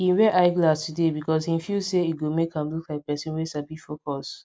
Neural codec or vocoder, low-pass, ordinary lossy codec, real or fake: none; none; none; real